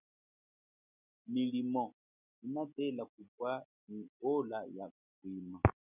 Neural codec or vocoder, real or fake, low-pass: none; real; 3.6 kHz